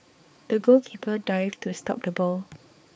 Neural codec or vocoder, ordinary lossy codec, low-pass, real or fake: codec, 16 kHz, 4 kbps, X-Codec, HuBERT features, trained on balanced general audio; none; none; fake